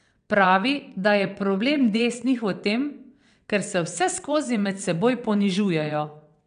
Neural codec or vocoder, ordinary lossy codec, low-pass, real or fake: vocoder, 22.05 kHz, 80 mel bands, WaveNeXt; none; 9.9 kHz; fake